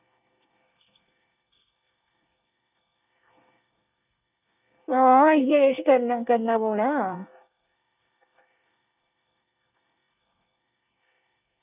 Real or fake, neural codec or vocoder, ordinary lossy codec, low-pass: fake; codec, 24 kHz, 1 kbps, SNAC; none; 3.6 kHz